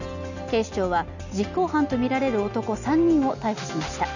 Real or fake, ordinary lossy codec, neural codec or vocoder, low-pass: real; none; none; 7.2 kHz